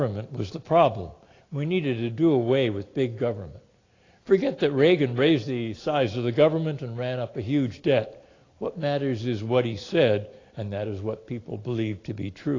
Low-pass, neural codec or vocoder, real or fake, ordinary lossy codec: 7.2 kHz; none; real; AAC, 32 kbps